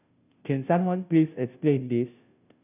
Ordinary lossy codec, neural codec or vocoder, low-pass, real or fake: none; codec, 16 kHz, 0.5 kbps, FunCodec, trained on Chinese and English, 25 frames a second; 3.6 kHz; fake